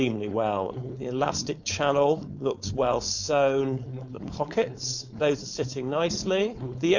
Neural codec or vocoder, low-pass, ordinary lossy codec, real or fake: codec, 16 kHz, 4.8 kbps, FACodec; 7.2 kHz; none; fake